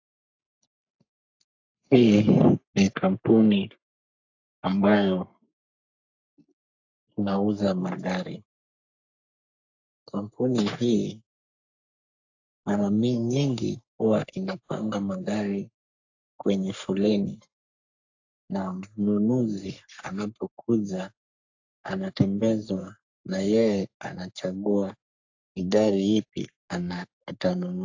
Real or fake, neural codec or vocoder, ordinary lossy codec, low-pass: fake; codec, 44.1 kHz, 3.4 kbps, Pupu-Codec; AAC, 48 kbps; 7.2 kHz